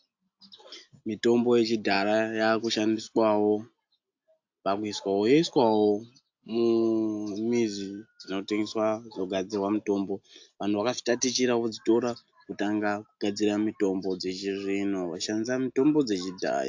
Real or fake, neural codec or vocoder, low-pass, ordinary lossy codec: real; none; 7.2 kHz; AAC, 48 kbps